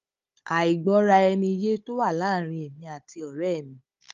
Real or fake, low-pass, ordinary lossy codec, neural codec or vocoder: fake; 7.2 kHz; Opus, 32 kbps; codec, 16 kHz, 16 kbps, FunCodec, trained on Chinese and English, 50 frames a second